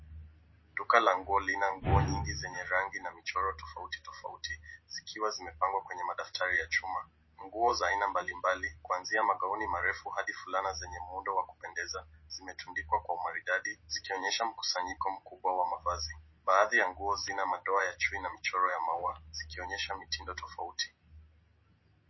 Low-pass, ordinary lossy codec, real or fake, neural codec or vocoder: 7.2 kHz; MP3, 24 kbps; real; none